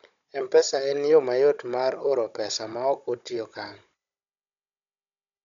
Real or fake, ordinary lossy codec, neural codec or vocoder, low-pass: fake; none; codec, 16 kHz, 16 kbps, FunCodec, trained on Chinese and English, 50 frames a second; 7.2 kHz